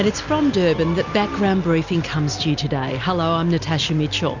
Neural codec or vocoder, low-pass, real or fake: none; 7.2 kHz; real